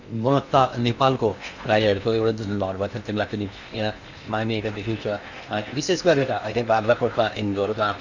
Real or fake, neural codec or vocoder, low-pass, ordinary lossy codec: fake; codec, 16 kHz in and 24 kHz out, 0.8 kbps, FocalCodec, streaming, 65536 codes; 7.2 kHz; none